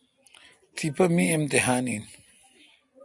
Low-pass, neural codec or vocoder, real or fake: 10.8 kHz; none; real